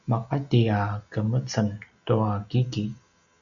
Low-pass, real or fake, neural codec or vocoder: 7.2 kHz; real; none